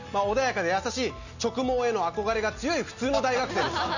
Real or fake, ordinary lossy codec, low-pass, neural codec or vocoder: real; none; 7.2 kHz; none